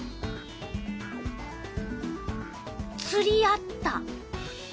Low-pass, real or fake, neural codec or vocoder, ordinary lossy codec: none; real; none; none